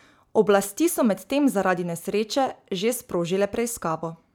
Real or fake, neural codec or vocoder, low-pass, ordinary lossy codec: real; none; none; none